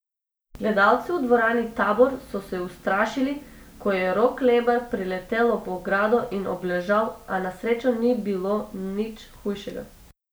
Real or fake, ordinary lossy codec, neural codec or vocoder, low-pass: real; none; none; none